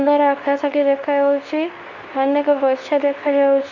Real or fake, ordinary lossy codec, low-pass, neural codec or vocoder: fake; none; 7.2 kHz; codec, 24 kHz, 0.9 kbps, WavTokenizer, medium speech release version 2